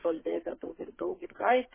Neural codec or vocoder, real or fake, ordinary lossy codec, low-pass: codec, 44.1 kHz, 2.6 kbps, SNAC; fake; MP3, 16 kbps; 3.6 kHz